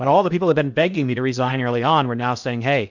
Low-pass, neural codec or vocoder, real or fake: 7.2 kHz; codec, 16 kHz in and 24 kHz out, 0.8 kbps, FocalCodec, streaming, 65536 codes; fake